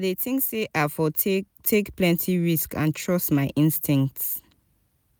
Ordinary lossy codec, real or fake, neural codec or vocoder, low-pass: none; real; none; none